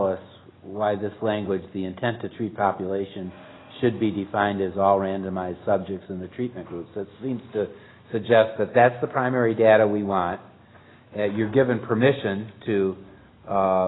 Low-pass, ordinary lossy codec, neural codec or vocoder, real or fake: 7.2 kHz; AAC, 16 kbps; none; real